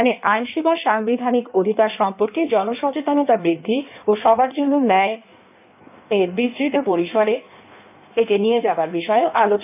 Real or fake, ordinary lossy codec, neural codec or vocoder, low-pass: fake; none; codec, 16 kHz in and 24 kHz out, 1.1 kbps, FireRedTTS-2 codec; 3.6 kHz